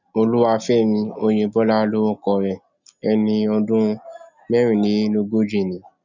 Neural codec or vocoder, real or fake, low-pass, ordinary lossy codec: none; real; 7.2 kHz; none